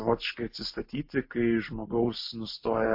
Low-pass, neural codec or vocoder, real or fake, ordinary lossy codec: 5.4 kHz; none; real; MP3, 32 kbps